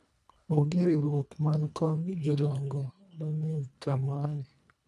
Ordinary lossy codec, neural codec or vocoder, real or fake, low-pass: none; codec, 24 kHz, 1.5 kbps, HILCodec; fake; none